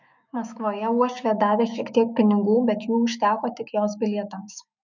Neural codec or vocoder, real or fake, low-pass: autoencoder, 48 kHz, 128 numbers a frame, DAC-VAE, trained on Japanese speech; fake; 7.2 kHz